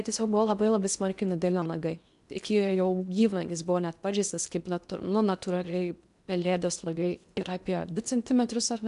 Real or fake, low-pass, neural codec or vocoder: fake; 10.8 kHz; codec, 16 kHz in and 24 kHz out, 0.8 kbps, FocalCodec, streaming, 65536 codes